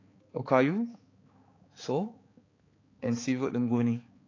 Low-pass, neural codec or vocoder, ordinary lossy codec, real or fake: 7.2 kHz; codec, 16 kHz, 4 kbps, X-Codec, HuBERT features, trained on balanced general audio; AAC, 32 kbps; fake